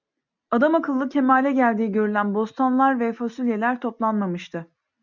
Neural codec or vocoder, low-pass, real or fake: none; 7.2 kHz; real